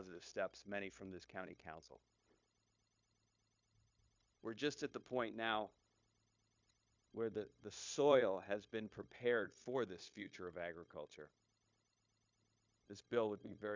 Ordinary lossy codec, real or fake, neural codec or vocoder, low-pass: MP3, 64 kbps; fake; codec, 16 kHz, 0.9 kbps, LongCat-Audio-Codec; 7.2 kHz